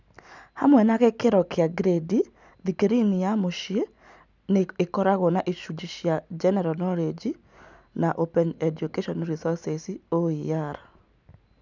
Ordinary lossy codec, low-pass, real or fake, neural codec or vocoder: none; 7.2 kHz; real; none